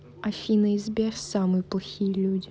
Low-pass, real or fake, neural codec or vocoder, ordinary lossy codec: none; real; none; none